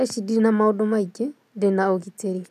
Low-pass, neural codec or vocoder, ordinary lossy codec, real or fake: 14.4 kHz; none; none; real